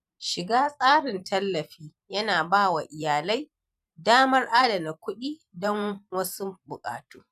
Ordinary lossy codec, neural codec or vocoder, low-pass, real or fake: none; vocoder, 44.1 kHz, 128 mel bands every 512 samples, BigVGAN v2; 14.4 kHz; fake